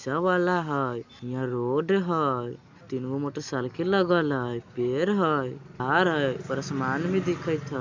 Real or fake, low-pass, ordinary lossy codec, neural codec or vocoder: real; 7.2 kHz; MP3, 64 kbps; none